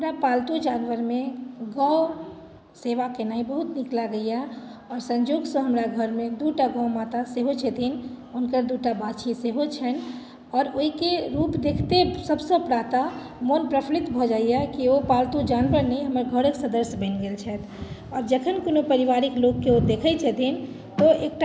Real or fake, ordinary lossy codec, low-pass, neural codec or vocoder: real; none; none; none